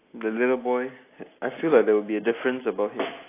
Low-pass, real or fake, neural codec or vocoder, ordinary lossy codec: 3.6 kHz; real; none; AAC, 24 kbps